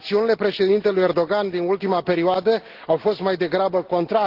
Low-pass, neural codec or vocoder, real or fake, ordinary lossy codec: 5.4 kHz; none; real; Opus, 16 kbps